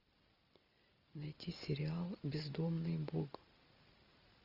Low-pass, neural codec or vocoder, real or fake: 5.4 kHz; none; real